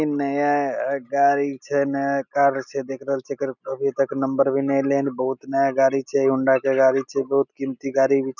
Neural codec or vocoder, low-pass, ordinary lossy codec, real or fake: none; 7.2 kHz; none; real